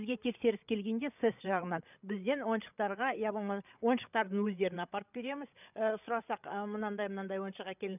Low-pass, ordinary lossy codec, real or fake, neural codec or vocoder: 3.6 kHz; none; fake; codec, 16 kHz, 8 kbps, FreqCodec, larger model